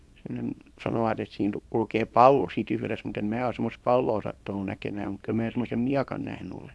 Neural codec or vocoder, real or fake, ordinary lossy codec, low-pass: codec, 24 kHz, 0.9 kbps, WavTokenizer, small release; fake; none; none